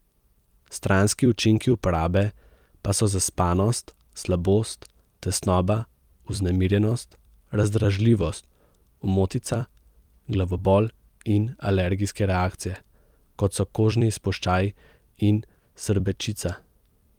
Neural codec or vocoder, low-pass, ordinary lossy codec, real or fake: vocoder, 44.1 kHz, 128 mel bands every 256 samples, BigVGAN v2; 19.8 kHz; Opus, 32 kbps; fake